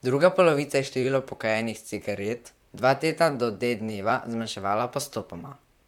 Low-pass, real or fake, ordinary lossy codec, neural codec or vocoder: 19.8 kHz; fake; MP3, 96 kbps; vocoder, 44.1 kHz, 128 mel bands, Pupu-Vocoder